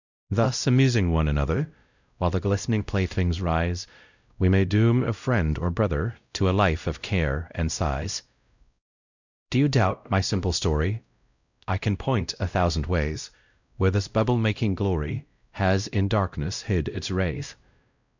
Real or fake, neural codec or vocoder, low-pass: fake; codec, 16 kHz, 0.5 kbps, X-Codec, WavLM features, trained on Multilingual LibriSpeech; 7.2 kHz